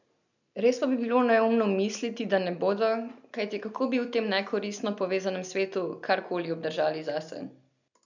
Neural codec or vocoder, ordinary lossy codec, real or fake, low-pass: none; none; real; 7.2 kHz